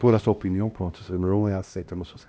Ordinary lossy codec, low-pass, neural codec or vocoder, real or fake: none; none; codec, 16 kHz, 1 kbps, X-Codec, HuBERT features, trained on LibriSpeech; fake